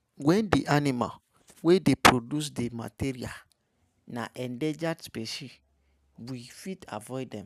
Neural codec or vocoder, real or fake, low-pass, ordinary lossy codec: none; real; 14.4 kHz; none